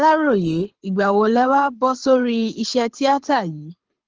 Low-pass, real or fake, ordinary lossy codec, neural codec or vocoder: 7.2 kHz; fake; Opus, 16 kbps; codec, 24 kHz, 6 kbps, HILCodec